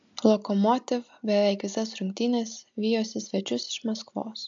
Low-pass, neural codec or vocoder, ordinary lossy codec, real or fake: 7.2 kHz; none; AAC, 64 kbps; real